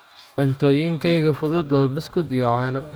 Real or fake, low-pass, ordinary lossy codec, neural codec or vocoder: fake; none; none; codec, 44.1 kHz, 2.6 kbps, DAC